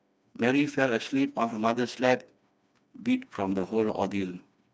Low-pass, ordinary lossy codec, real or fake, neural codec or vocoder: none; none; fake; codec, 16 kHz, 2 kbps, FreqCodec, smaller model